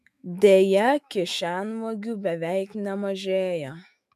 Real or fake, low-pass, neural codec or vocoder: fake; 14.4 kHz; autoencoder, 48 kHz, 128 numbers a frame, DAC-VAE, trained on Japanese speech